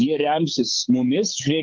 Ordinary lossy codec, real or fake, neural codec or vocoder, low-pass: Opus, 24 kbps; fake; codec, 16 kHz, 6 kbps, DAC; 7.2 kHz